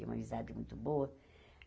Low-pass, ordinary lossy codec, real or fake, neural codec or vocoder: none; none; real; none